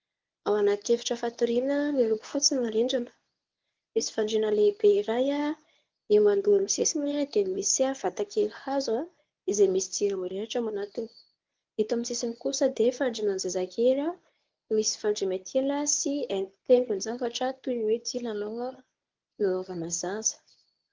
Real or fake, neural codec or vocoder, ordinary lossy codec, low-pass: fake; codec, 24 kHz, 0.9 kbps, WavTokenizer, medium speech release version 1; Opus, 24 kbps; 7.2 kHz